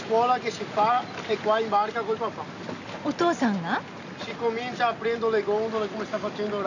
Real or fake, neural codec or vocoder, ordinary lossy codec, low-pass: real; none; AAC, 48 kbps; 7.2 kHz